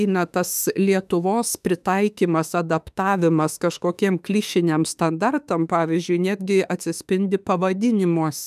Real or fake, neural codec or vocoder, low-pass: fake; autoencoder, 48 kHz, 32 numbers a frame, DAC-VAE, trained on Japanese speech; 14.4 kHz